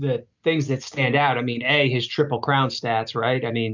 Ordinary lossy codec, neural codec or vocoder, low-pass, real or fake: MP3, 64 kbps; none; 7.2 kHz; real